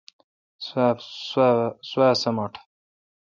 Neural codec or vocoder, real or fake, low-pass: none; real; 7.2 kHz